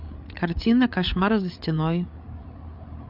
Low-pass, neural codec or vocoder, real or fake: 5.4 kHz; codec, 16 kHz, 8 kbps, FreqCodec, larger model; fake